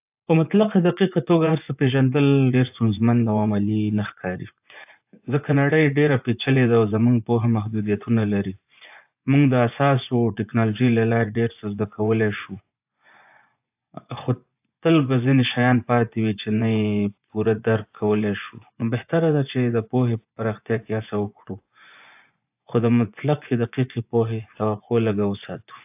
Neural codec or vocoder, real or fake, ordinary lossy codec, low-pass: vocoder, 24 kHz, 100 mel bands, Vocos; fake; AAC, 32 kbps; 3.6 kHz